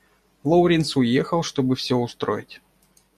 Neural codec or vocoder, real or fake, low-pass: none; real; 14.4 kHz